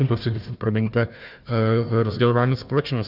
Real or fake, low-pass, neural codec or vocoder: fake; 5.4 kHz; codec, 16 kHz, 1 kbps, FunCodec, trained on Chinese and English, 50 frames a second